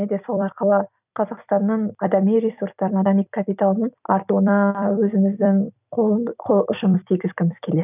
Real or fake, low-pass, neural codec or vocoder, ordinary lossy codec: fake; 3.6 kHz; vocoder, 44.1 kHz, 128 mel bands every 256 samples, BigVGAN v2; none